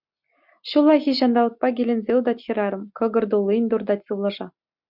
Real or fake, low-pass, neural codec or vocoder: real; 5.4 kHz; none